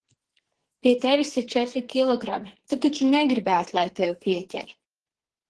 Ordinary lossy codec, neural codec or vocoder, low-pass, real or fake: Opus, 16 kbps; codec, 44.1 kHz, 2.6 kbps, SNAC; 10.8 kHz; fake